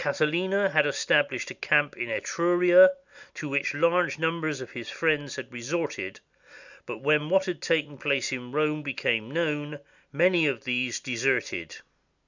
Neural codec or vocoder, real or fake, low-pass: none; real; 7.2 kHz